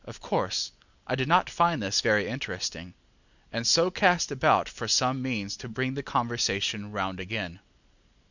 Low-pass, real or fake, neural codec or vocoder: 7.2 kHz; real; none